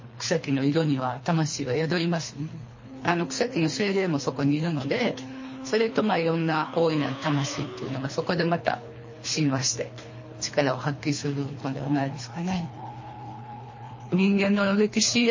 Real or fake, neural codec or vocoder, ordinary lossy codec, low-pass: fake; codec, 24 kHz, 3 kbps, HILCodec; MP3, 32 kbps; 7.2 kHz